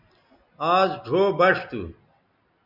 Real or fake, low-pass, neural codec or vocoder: real; 5.4 kHz; none